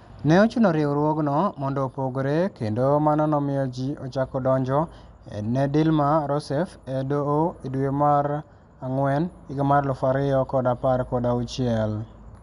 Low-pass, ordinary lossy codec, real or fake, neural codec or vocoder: 10.8 kHz; none; real; none